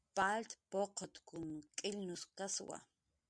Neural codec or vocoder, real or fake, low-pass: none; real; 9.9 kHz